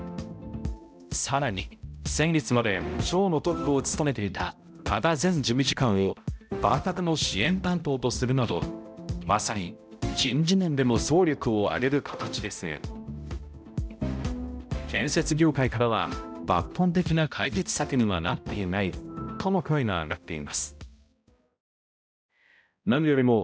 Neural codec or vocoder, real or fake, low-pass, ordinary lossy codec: codec, 16 kHz, 0.5 kbps, X-Codec, HuBERT features, trained on balanced general audio; fake; none; none